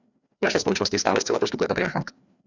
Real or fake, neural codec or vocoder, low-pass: fake; codec, 16 kHz, 2 kbps, FreqCodec, larger model; 7.2 kHz